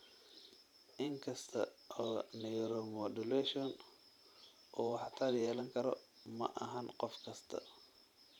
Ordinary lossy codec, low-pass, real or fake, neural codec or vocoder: none; 19.8 kHz; fake; vocoder, 44.1 kHz, 128 mel bands every 512 samples, BigVGAN v2